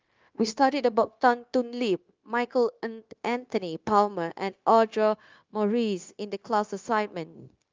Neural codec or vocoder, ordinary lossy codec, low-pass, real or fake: codec, 16 kHz, 0.9 kbps, LongCat-Audio-Codec; Opus, 32 kbps; 7.2 kHz; fake